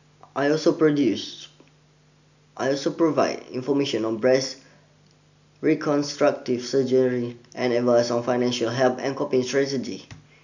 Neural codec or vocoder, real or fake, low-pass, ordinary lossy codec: none; real; 7.2 kHz; none